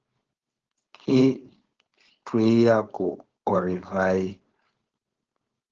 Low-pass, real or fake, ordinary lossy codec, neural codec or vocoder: 7.2 kHz; fake; Opus, 16 kbps; codec, 16 kHz, 4.8 kbps, FACodec